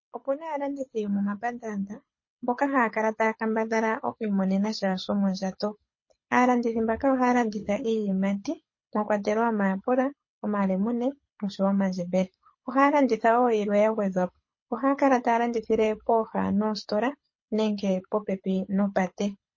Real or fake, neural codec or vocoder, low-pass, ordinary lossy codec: fake; codec, 24 kHz, 6 kbps, HILCodec; 7.2 kHz; MP3, 32 kbps